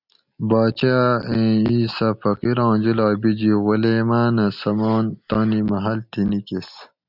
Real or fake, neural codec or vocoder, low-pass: real; none; 5.4 kHz